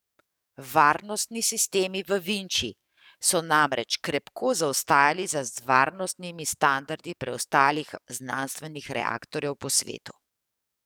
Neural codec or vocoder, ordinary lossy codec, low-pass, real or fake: codec, 44.1 kHz, 7.8 kbps, DAC; none; none; fake